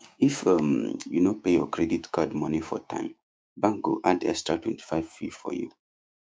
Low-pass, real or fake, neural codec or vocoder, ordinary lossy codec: none; fake; codec, 16 kHz, 6 kbps, DAC; none